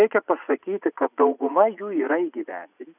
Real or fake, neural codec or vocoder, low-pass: fake; vocoder, 44.1 kHz, 80 mel bands, Vocos; 3.6 kHz